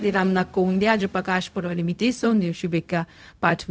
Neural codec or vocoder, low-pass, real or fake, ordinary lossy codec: codec, 16 kHz, 0.4 kbps, LongCat-Audio-Codec; none; fake; none